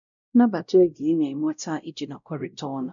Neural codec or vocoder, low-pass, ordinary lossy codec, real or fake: codec, 16 kHz, 0.5 kbps, X-Codec, WavLM features, trained on Multilingual LibriSpeech; 7.2 kHz; none; fake